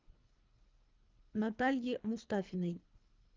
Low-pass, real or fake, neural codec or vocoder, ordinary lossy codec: 7.2 kHz; fake; codec, 24 kHz, 3 kbps, HILCodec; Opus, 24 kbps